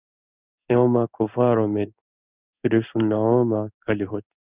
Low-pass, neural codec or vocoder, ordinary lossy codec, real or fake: 3.6 kHz; codec, 16 kHz in and 24 kHz out, 1 kbps, XY-Tokenizer; Opus, 64 kbps; fake